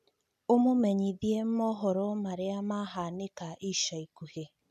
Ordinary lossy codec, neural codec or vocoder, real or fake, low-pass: none; none; real; 14.4 kHz